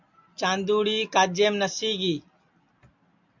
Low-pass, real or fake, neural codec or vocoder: 7.2 kHz; real; none